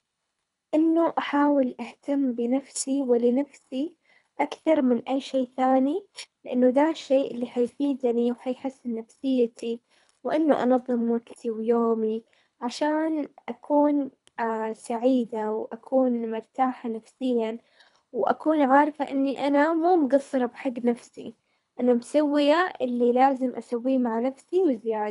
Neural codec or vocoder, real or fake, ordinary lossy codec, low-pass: codec, 24 kHz, 3 kbps, HILCodec; fake; none; 10.8 kHz